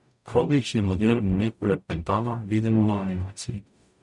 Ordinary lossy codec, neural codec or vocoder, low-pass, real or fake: none; codec, 44.1 kHz, 0.9 kbps, DAC; 10.8 kHz; fake